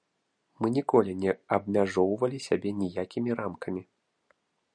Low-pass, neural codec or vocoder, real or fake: 9.9 kHz; none; real